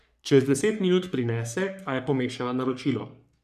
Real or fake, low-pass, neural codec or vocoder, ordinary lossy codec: fake; 14.4 kHz; codec, 44.1 kHz, 3.4 kbps, Pupu-Codec; none